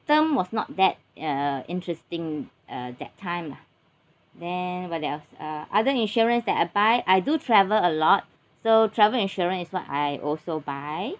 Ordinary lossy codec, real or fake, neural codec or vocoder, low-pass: none; real; none; none